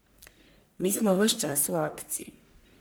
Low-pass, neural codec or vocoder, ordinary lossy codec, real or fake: none; codec, 44.1 kHz, 1.7 kbps, Pupu-Codec; none; fake